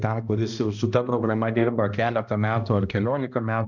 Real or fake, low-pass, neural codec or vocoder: fake; 7.2 kHz; codec, 16 kHz, 1 kbps, X-Codec, HuBERT features, trained on balanced general audio